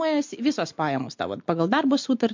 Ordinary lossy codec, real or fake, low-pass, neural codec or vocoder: MP3, 48 kbps; real; 7.2 kHz; none